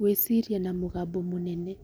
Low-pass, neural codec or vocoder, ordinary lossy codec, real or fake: none; none; none; real